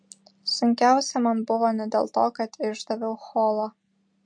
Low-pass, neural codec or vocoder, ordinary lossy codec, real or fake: 9.9 kHz; none; MP3, 48 kbps; real